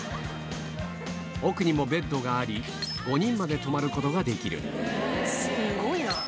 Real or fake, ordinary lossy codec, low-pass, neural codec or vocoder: real; none; none; none